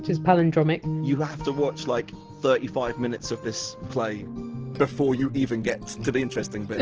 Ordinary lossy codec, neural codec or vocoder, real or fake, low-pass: Opus, 16 kbps; none; real; 7.2 kHz